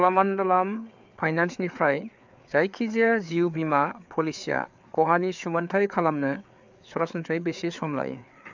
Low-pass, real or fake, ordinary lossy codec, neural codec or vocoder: 7.2 kHz; fake; MP3, 64 kbps; codec, 16 kHz, 4 kbps, FreqCodec, larger model